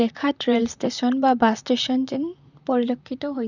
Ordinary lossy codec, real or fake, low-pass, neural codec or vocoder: none; fake; 7.2 kHz; vocoder, 44.1 kHz, 128 mel bands, Pupu-Vocoder